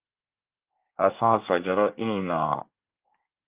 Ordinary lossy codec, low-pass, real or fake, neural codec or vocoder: Opus, 32 kbps; 3.6 kHz; fake; codec, 24 kHz, 1 kbps, SNAC